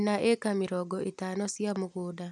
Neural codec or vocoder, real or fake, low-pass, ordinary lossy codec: none; real; none; none